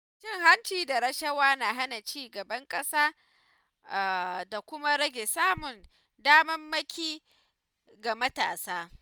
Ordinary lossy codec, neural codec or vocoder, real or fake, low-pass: none; none; real; none